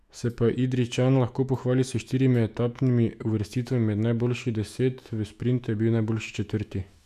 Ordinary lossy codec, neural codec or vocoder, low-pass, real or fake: none; autoencoder, 48 kHz, 128 numbers a frame, DAC-VAE, trained on Japanese speech; 14.4 kHz; fake